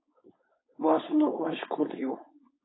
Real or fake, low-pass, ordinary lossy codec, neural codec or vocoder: fake; 7.2 kHz; AAC, 16 kbps; codec, 16 kHz, 4.8 kbps, FACodec